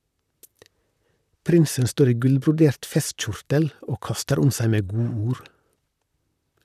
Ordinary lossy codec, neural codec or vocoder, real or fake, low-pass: none; vocoder, 44.1 kHz, 128 mel bands, Pupu-Vocoder; fake; 14.4 kHz